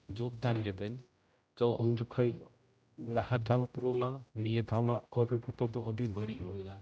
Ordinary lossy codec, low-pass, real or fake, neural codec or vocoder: none; none; fake; codec, 16 kHz, 0.5 kbps, X-Codec, HuBERT features, trained on general audio